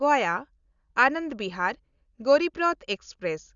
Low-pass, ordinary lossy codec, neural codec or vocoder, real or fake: 7.2 kHz; Opus, 64 kbps; none; real